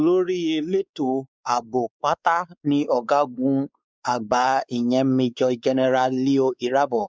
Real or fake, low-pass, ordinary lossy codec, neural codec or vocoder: fake; none; none; codec, 16 kHz, 4 kbps, X-Codec, WavLM features, trained on Multilingual LibriSpeech